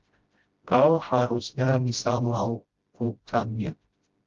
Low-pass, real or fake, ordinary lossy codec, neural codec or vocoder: 7.2 kHz; fake; Opus, 32 kbps; codec, 16 kHz, 0.5 kbps, FreqCodec, smaller model